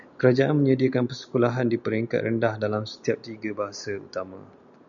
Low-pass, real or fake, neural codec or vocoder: 7.2 kHz; real; none